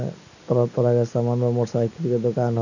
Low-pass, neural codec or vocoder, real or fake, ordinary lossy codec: 7.2 kHz; none; real; none